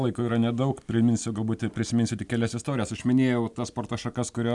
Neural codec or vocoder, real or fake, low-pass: none; real; 10.8 kHz